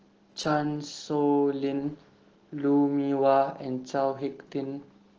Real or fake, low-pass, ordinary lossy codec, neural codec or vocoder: real; 7.2 kHz; Opus, 16 kbps; none